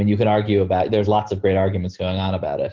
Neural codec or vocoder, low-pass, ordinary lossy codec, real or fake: none; 7.2 kHz; Opus, 16 kbps; real